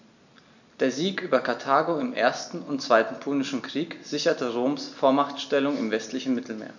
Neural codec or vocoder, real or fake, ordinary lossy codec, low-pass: none; real; none; 7.2 kHz